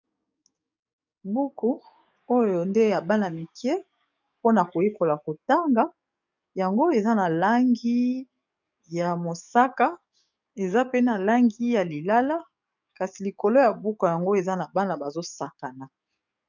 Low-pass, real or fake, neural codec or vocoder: 7.2 kHz; fake; codec, 44.1 kHz, 7.8 kbps, DAC